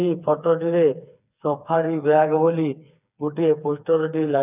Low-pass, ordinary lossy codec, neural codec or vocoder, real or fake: 3.6 kHz; none; codec, 16 kHz, 4 kbps, FreqCodec, smaller model; fake